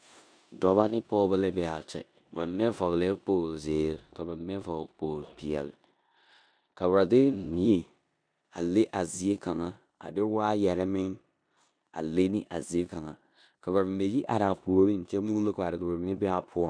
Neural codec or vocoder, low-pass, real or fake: codec, 16 kHz in and 24 kHz out, 0.9 kbps, LongCat-Audio-Codec, four codebook decoder; 9.9 kHz; fake